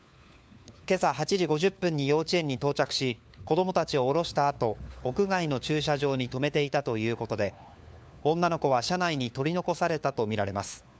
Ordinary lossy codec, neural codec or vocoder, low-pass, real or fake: none; codec, 16 kHz, 4 kbps, FunCodec, trained on LibriTTS, 50 frames a second; none; fake